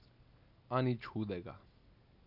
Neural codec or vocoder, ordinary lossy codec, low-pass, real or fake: none; none; 5.4 kHz; real